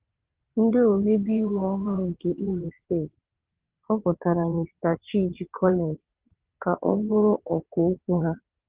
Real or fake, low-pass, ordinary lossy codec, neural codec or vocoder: fake; 3.6 kHz; Opus, 16 kbps; vocoder, 22.05 kHz, 80 mel bands, WaveNeXt